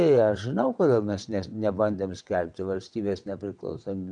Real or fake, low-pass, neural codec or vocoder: fake; 9.9 kHz; vocoder, 22.05 kHz, 80 mel bands, WaveNeXt